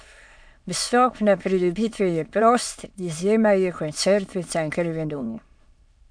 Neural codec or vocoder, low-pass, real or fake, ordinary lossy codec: autoencoder, 22.05 kHz, a latent of 192 numbers a frame, VITS, trained on many speakers; 9.9 kHz; fake; MP3, 64 kbps